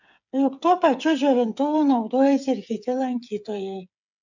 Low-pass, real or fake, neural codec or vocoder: 7.2 kHz; fake; codec, 16 kHz, 8 kbps, FreqCodec, smaller model